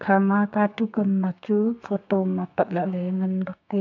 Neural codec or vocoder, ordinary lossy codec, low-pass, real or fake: codec, 32 kHz, 1.9 kbps, SNAC; none; 7.2 kHz; fake